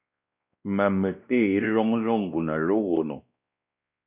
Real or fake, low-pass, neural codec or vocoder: fake; 3.6 kHz; codec, 16 kHz, 1 kbps, X-Codec, WavLM features, trained on Multilingual LibriSpeech